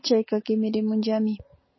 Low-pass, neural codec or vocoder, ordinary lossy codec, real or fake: 7.2 kHz; none; MP3, 24 kbps; real